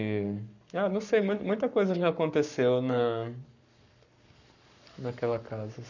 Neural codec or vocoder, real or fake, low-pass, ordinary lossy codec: codec, 44.1 kHz, 7.8 kbps, Pupu-Codec; fake; 7.2 kHz; none